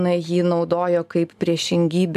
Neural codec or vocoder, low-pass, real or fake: none; 14.4 kHz; real